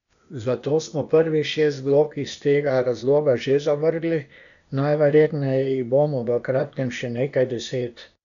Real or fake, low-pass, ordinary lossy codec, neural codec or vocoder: fake; 7.2 kHz; none; codec, 16 kHz, 0.8 kbps, ZipCodec